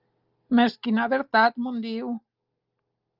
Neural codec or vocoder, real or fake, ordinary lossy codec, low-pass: none; real; Opus, 32 kbps; 5.4 kHz